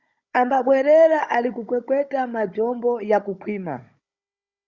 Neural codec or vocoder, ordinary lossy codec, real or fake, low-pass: codec, 16 kHz, 16 kbps, FunCodec, trained on Chinese and English, 50 frames a second; Opus, 64 kbps; fake; 7.2 kHz